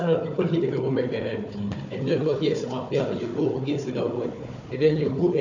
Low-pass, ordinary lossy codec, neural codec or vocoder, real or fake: 7.2 kHz; none; codec, 16 kHz, 4 kbps, FunCodec, trained on Chinese and English, 50 frames a second; fake